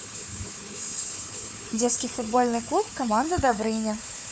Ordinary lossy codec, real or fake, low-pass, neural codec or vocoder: none; fake; none; codec, 16 kHz, 4 kbps, FunCodec, trained on Chinese and English, 50 frames a second